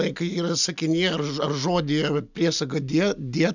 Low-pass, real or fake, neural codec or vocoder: 7.2 kHz; real; none